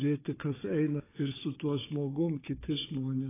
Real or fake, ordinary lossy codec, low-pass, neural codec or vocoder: fake; AAC, 16 kbps; 3.6 kHz; codec, 16 kHz, 4 kbps, FunCodec, trained on Chinese and English, 50 frames a second